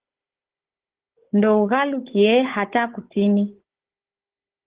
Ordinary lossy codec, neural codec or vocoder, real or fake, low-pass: Opus, 16 kbps; codec, 16 kHz, 16 kbps, FunCodec, trained on Chinese and English, 50 frames a second; fake; 3.6 kHz